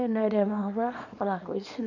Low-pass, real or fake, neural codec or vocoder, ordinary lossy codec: 7.2 kHz; fake; codec, 24 kHz, 0.9 kbps, WavTokenizer, small release; none